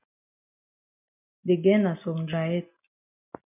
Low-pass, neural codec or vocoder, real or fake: 3.6 kHz; none; real